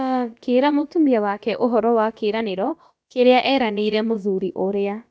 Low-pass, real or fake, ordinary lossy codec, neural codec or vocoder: none; fake; none; codec, 16 kHz, about 1 kbps, DyCAST, with the encoder's durations